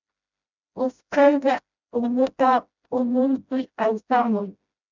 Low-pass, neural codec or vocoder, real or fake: 7.2 kHz; codec, 16 kHz, 0.5 kbps, FreqCodec, smaller model; fake